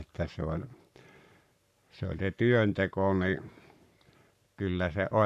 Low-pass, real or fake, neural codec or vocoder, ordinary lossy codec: 14.4 kHz; fake; codec, 44.1 kHz, 7.8 kbps, Pupu-Codec; none